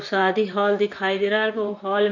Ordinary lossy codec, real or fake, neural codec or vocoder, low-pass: none; fake; vocoder, 44.1 kHz, 128 mel bands, Pupu-Vocoder; 7.2 kHz